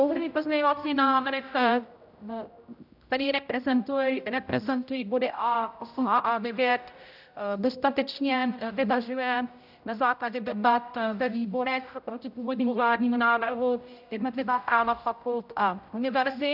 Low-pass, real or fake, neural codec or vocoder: 5.4 kHz; fake; codec, 16 kHz, 0.5 kbps, X-Codec, HuBERT features, trained on general audio